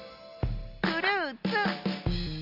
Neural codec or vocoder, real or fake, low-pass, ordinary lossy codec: none; real; 5.4 kHz; none